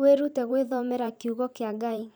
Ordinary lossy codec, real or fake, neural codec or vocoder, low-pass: none; fake; vocoder, 44.1 kHz, 128 mel bands every 512 samples, BigVGAN v2; none